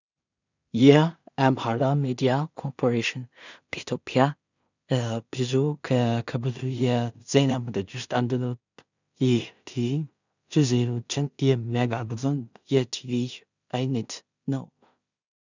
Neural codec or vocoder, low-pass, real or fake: codec, 16 kHz in and 24 kHz out, 0.4 kbps, LongCat-Audio-Codec, two codebook decoder; 7.2 kHz; fake